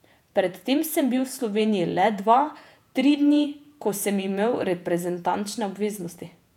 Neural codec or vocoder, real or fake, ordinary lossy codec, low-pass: vocoder, 48 kHz, 128 mel bands, Vocos; fake; none; 19.8 kHz